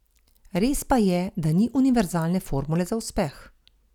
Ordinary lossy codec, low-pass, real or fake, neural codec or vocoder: none; 19.8 kHz; real; none